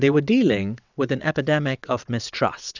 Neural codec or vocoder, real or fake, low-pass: vocoder, 22.05 kHz, 80 mel bands, WaveNeXt; fake; 7.2 kHz